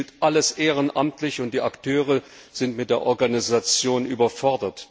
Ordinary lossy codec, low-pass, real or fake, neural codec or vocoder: none; none; real; none